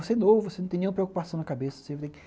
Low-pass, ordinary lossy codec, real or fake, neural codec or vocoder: none; none; real; none